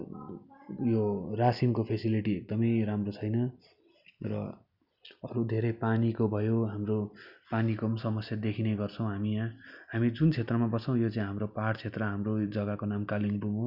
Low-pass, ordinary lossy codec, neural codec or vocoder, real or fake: 5.4 kHz; Opus, 64 kbps; none; real